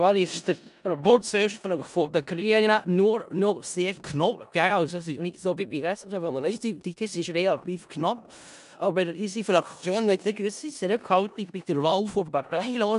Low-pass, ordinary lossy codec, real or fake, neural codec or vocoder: 10.8 kHz; none; fake; codec, 16 kHz in and 24 kHz out, 0.4 kbps, LongCat-Audio-Codec, four codebook decoder